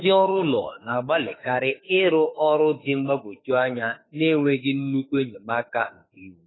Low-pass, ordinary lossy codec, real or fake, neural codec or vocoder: 7.2 kHz; AAC, 16 kbps; fake; autoencoder, 48 kHz, 32 numbers a frame, DAC-VAE, trained on Japanese speech